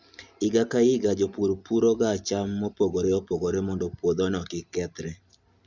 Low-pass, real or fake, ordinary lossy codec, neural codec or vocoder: 7.2 kHz; real; Opus, 64 kbps; none